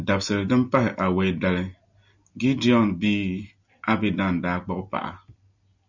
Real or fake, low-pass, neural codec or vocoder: real; 7.2 kHz; none